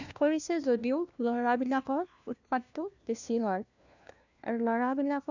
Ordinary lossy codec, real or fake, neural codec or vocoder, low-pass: none; fake; codec, 16 kHz, 1 kbps, FunCodec, trained on LibriTTS, 50 frames a second; 7.2 kHz